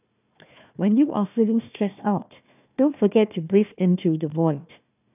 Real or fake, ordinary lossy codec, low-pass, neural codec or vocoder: fake; none; 3.6 kHz; codec, 16 kHz, 1 kbps, FunCodec, trained on Chinese and English, 50 frames a second